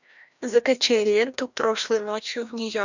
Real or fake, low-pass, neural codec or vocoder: fake; 7.2 kHz; codec, 16 kHz, 1 kbps, FreqCodec, larger model